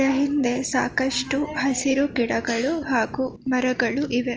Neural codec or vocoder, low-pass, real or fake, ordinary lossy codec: none; 7.2 kHz; real; Opus, 24 kbps